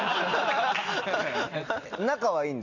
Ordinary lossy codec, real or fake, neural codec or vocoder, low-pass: none; real; none; 7.2 kHz